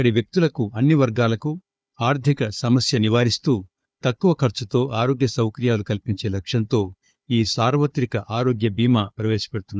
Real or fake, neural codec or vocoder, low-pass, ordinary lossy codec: fake; codec, 16 kHz, 4 kbps, FunCodec, trained on Chinese and English, 50 frames a second; none; none